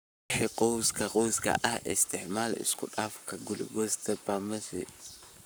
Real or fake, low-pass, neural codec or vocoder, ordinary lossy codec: fake; none; codec, 44.1 kHz, 7.8 kbps, Pupu-Codec; none